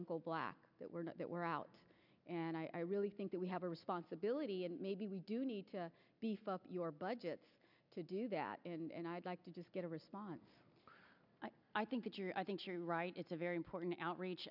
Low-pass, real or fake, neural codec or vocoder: 5.4 kHz; real; none